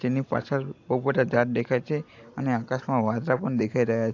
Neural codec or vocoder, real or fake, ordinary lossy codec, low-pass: none; real; AAC, 48 kbps; 7.2 kHz